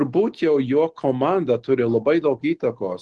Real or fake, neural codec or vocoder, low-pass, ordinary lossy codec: real; none; 10.8 kHz; Opus, 16 kbps